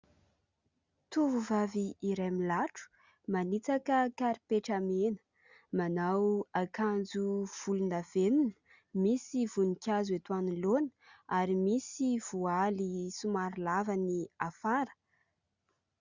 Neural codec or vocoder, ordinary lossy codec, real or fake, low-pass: none; Opus, 64 kbps; real; 7.2 kHz